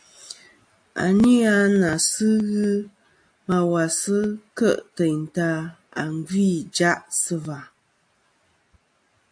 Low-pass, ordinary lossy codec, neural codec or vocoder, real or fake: 9.9 kHz; AAC, 64 kbps; none; real